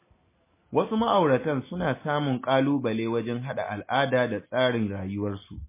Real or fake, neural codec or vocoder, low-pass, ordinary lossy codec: real; none; 3.6 kHz; MP3, 16 kbps